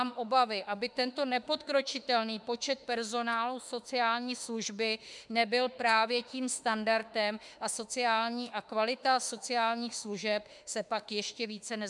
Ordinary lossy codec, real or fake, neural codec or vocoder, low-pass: MP3, 96 kbps; fake; autoencoder, 48 kHz, 32 numbers a frame, DAC-VAE, trained on Japanese speech; 10.8 kHz